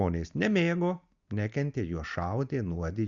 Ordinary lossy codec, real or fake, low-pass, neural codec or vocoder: Opus, 64 kbps; real; 7.2 kHz; none